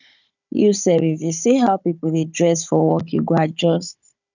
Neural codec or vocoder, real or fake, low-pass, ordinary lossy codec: codec, 16 kHz, 16 kbps, FunCodec, trained on Chinese and English, 50 frames a second; fake; 7.2 kHz; none